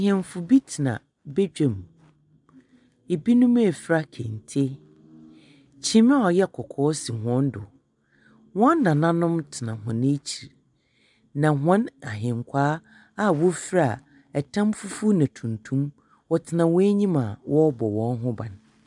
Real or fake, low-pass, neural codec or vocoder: real; 10.8 kHz; none